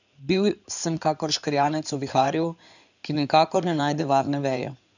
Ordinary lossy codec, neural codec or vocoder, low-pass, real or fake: none; codec, 16 kHz in and 24 kHz out, 2.2 kbps, FireRedTTS-2 codec; 7.2 kHz; fake